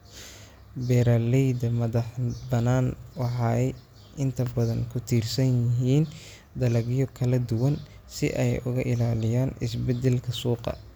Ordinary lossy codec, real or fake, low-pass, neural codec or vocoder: none; real; none; none